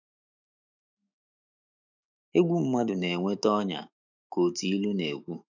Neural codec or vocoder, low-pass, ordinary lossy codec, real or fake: autoencoder, 48 kHz, 128 numbers a frame, DAC-VAE, trained on Japanese speech; 7.2 kHz; none; fake